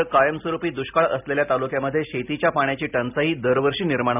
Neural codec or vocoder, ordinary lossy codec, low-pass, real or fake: none; none; 3.6 kHz; real